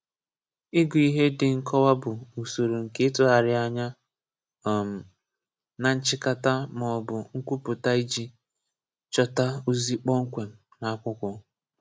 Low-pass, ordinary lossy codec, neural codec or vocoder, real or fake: none; none; none; real